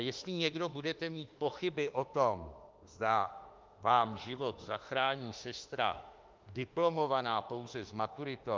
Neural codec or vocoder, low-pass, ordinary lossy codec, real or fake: autoencoder, 48 kHz, 32 numbers a frame, DAC-VAE, trained on Japanese speech; 7.2 kHz; Opus, 32 kbps; fake